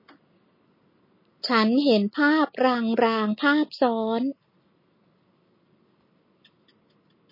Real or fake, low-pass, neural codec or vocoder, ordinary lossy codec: real; 5.4 kHz; none; MP3, 24 kbps